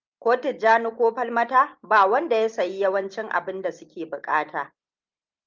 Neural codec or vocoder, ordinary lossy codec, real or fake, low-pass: none; Opus, 24 kbps; real; 7.2 kHz